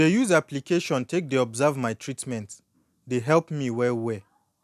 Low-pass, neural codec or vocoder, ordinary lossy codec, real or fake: 14.4 kHz; none; none; real